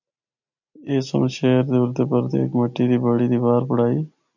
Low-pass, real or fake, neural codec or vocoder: 7.2 kHz; real; none